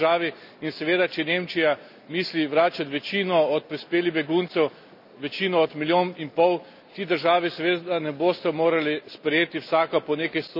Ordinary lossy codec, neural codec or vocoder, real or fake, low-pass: none; none; real; 5.4 kHz